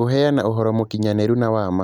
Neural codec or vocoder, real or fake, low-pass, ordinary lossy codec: none; real; 19.8 kHz; none